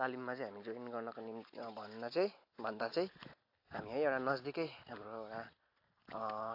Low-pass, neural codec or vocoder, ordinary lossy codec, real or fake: 5.4 kHz; none; AAC, 32 kbps; real